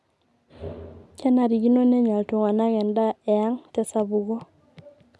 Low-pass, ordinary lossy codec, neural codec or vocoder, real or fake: none; none; none; real